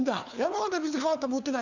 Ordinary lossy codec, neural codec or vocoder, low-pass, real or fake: none; codec, 16 kHz, 4 kbps, FunCodec, trained on LibriTTS, 50 frames a second; 7.2 kHz; fake